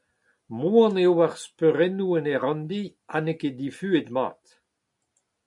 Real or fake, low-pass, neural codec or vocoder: real; 10.8 kHz; none